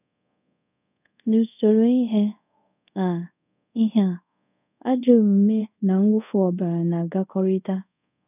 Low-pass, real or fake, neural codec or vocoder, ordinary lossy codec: 3.6 kHz; fake; codec, 24 kHz, 0.5 kbps, DualCodec; none